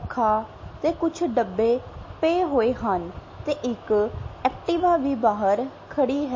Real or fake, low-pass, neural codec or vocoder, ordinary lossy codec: real; 7.2 kHz; none; MP3, 32 kbps